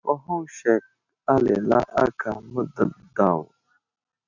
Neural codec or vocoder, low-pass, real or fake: none; 7.2 kHz; real